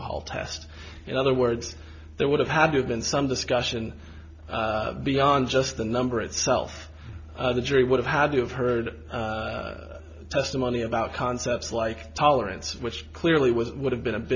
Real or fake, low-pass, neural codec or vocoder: real; 7.2 kHz; none